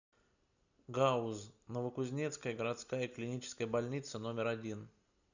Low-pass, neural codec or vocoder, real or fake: 7.2 kHz; none; real